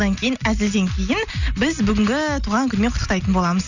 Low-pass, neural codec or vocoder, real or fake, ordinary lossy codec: 7.2 kHz; none; real; none